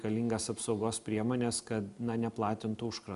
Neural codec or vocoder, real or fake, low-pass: none; real; 10.8 kHz